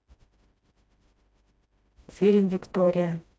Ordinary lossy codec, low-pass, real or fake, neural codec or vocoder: none; none; fake; codec, 16 kHz, 1 kbps, FreqCodec, smaller model